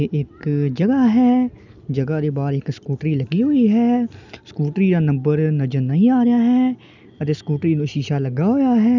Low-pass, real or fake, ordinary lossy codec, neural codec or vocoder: 7.2 kHz; real; none; none